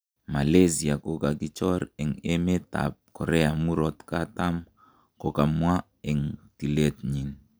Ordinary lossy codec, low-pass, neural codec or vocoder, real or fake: none; none; none; real